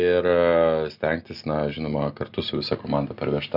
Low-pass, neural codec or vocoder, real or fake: 5.4 kHz; none; real